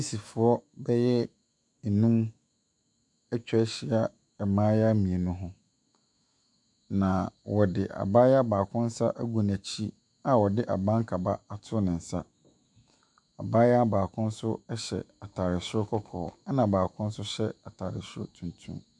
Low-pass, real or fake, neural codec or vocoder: 10.8 kHz; fake; autoencoder, 48 kHz, 128 numbers a frame, DAC-VAE, trained on Japanese speech